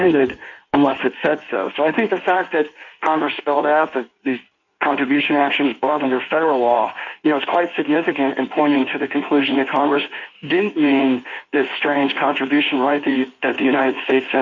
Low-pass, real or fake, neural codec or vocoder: 7.2 kHz; fake; codec, 16 kHz in and 24 kHz out, 1.1 kbps, FireRedTTS-2 codec